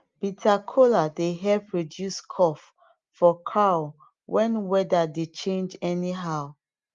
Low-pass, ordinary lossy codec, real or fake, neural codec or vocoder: 7.2 kHz; Opus, 32 kbps; real; none